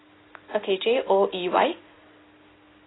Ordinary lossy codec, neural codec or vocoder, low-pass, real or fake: AAC, 16 kbps; none; 7.2 kHz; real